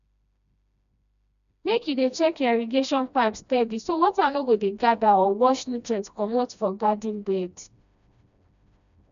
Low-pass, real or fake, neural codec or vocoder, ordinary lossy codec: 7.2 kHz; fake; codec, 16 kHz, 1 kbps, FreqCodec, smaller model; none